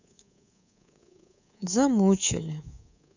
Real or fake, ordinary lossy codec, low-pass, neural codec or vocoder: fake; none; 7.2 kHz; codec, 24 kHz, 3.1 kbps, DualCodec